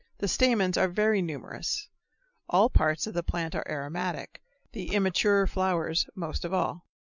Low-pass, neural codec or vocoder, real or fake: 7.2 kHz; none; real